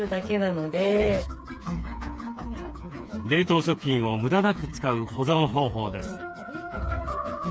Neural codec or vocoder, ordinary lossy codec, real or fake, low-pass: codec, 16 kHz, 4 kbps, FreqCodec, smaller model; none; fake; none